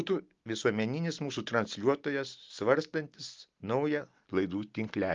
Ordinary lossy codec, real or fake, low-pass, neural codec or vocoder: Opus, 32 kbps; real; 7.2 kHz; none